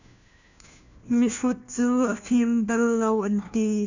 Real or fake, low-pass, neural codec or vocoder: fake; 7.2 kHz; codec, 16 kHz, 1 kbps, FunCodec, trained on LibriTTS, 50 frames a second